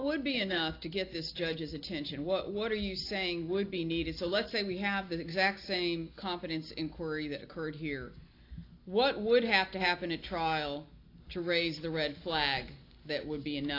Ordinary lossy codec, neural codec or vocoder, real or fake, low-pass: AAC, 32 kbps; none; real; 5.4 kHz